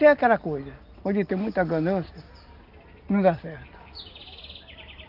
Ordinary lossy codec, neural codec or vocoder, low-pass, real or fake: Opus, 24 kbps; none; 5.4 kHz; real